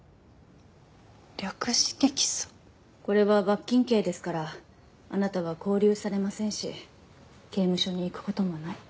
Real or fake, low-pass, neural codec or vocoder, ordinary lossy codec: real; none; none; none